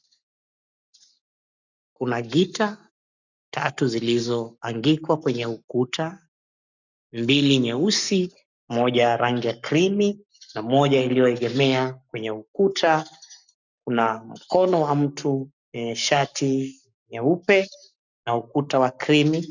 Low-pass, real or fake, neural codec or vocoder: 7.2 kHz; fake; codec, 44.1 kHz, 7.8 kbps, Pupu-Codec